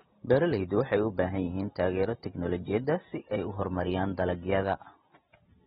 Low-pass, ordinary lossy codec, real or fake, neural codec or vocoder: 19.8 kHz; AAC, 16 kbps; real; none